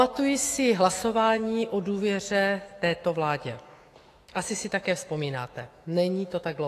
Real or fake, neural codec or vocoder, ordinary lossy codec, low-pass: fake; autoencoder, 48 kHz, 128 numbers a frame, DAC-VAE, trained on Japanese speech; AAC, 48 kbps; 14.4 kHz